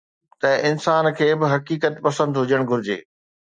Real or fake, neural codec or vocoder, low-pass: real; none; 9.9 kHz